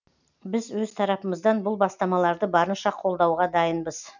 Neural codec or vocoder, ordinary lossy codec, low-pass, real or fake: none; none; 7.2 kHz; real